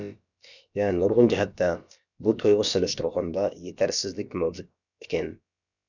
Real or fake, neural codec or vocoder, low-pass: fake; codec, 16 kHz, about 1 kbps, DyCAST, with the encoder's durations; 7.2 kHz